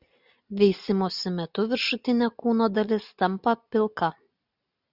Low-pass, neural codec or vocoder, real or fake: 5.4 kHz; none; real